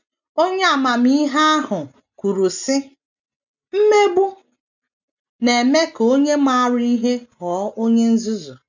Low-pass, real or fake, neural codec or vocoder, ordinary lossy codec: 7.2 kHz; real; none; none